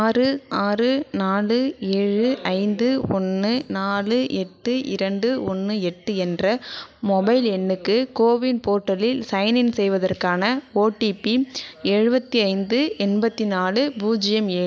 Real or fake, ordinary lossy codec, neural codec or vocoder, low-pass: real; none; none; none